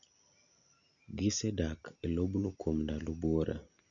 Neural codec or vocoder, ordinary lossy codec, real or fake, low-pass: none; none; real; 7.2 kHz